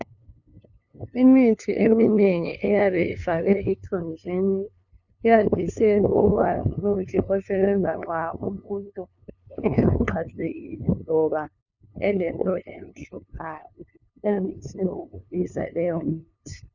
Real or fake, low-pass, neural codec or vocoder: fake; 7.2 kHz; codec, 16 kHz, 2 kbps, FunCodec, trained on LibriTTS, 25 frames a second